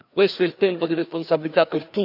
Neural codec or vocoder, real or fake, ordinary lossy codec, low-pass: codec, 16 kHz, 2 kbps, FreqCodec, larger model; fake; none; 5.4 kHz